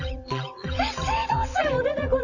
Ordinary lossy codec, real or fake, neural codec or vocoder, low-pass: none; fake; vocoder, 22.05 kHz, 80 mel bands, WaveNeXt; 7.2 kHz